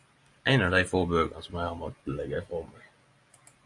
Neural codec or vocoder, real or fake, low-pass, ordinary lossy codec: none; real; 10.8 kHz; AAC, 48 kbps